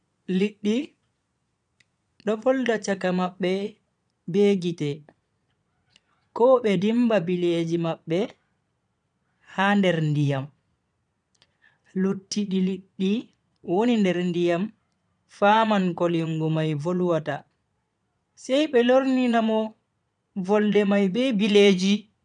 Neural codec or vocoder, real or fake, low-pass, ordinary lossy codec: vocoder, 22.05 kHz, 80 mel bands, Vocos; fake; 9.9 kHz; none